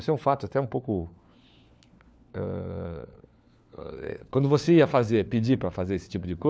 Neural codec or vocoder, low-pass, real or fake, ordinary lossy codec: codec, 16 kHz, 4 kbps, FunCodec, trained on LibriTTS, 50 frames a second; none; fake; none